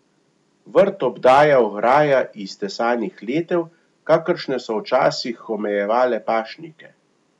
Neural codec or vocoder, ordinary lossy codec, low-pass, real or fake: none; none; 10.8 kHz; real